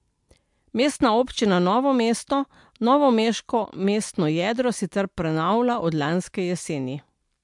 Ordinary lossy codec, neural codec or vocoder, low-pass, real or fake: MP3, 64 kbps; none; 10.8 kHz; real